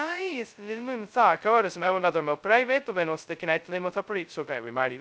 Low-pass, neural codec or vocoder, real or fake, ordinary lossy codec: none; codec, 16 kHz, 0.2 kbps, FocalCodec; fake; none